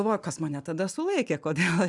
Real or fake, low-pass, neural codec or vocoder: real; 10.8 kHz; none